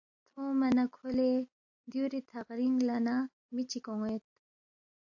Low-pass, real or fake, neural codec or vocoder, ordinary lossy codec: 7.2 kHz; real; none; AAC, 48 kbps